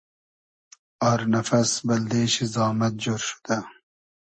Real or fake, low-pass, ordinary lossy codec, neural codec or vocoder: real; 9.9 kHz; MP3, 32 kbps; none